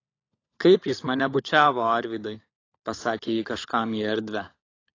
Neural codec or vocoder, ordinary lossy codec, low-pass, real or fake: codec, 16 kHz, 16 kbps, FunCodec, trained on LibriTTS, 50 frames a second; AAC, 32 kbps; 7.2 kHz; fake